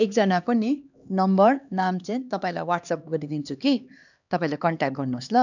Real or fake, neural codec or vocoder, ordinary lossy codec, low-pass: fake; codec, 16 kHz, 2 kbps, X-Codec, HuBERT features, trained on LibriSpeech; none; 7.2 kHz